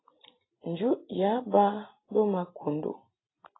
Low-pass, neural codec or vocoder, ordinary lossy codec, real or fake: 7.2 kHz; none; AAC, 16 kbps; real